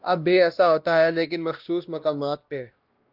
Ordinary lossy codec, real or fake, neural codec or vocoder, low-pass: Opus, 32 kbps; fake; codec, 16 kHz, 1 kbps, X-Codec, WavLM features, trained on Multilingual LibriSpeech; 5.4 kHz